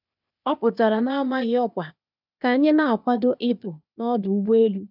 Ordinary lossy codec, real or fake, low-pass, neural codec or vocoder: none; fake; 5.4 kHz; codec, 16 kHz, 0.8 kbps, ZipCodec